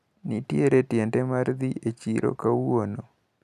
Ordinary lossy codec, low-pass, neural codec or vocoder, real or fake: none; 14.4 kHz; none; real